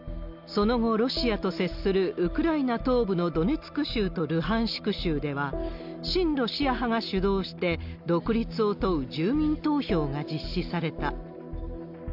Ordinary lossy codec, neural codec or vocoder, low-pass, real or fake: none; none; 5.4 kHz; real